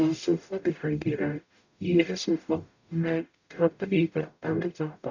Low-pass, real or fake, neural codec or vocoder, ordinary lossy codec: 7.2 kHz; fake; codec, 44.1 kHz, 0.9 kbps, DAC; none